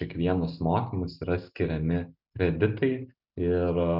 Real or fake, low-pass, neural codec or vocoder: real; 5.4 kHz; none